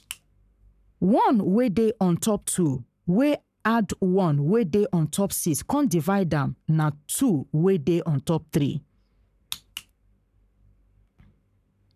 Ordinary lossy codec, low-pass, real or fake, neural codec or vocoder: none; 14.4 kHz; fake; codec, 44.1 kHz, 7.8 kbps, Pupu-Codec